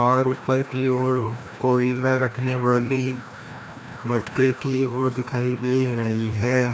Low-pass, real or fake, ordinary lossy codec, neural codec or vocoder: none; fake; none; codec, 16 kHz, 1 kbps, FreqCodec, larger model